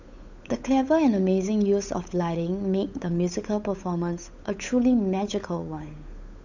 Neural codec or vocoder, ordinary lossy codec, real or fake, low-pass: codec, 16 kHz, 8 kbps, FunCodec, trained on Chinese and English, 25 frames a second; none; fake; 7.2 kHz